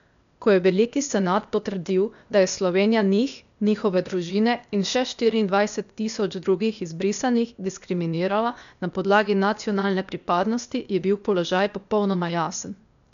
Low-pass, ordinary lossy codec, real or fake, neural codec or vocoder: 7.2 kHz; none; fake; codec, 16 kHz, 0.8 kbps, ZipCodec